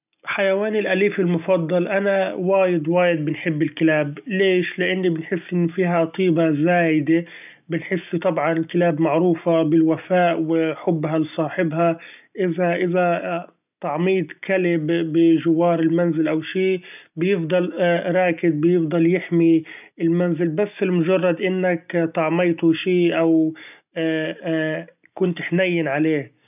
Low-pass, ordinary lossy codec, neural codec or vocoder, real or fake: 3.6 kHz; none; none; real